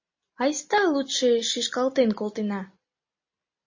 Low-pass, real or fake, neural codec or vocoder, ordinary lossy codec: 7.2 kHz; real; none; MP3, 32 kbps